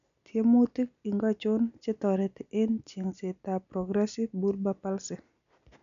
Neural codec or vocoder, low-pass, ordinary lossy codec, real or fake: none; 7.2 kHz; none; real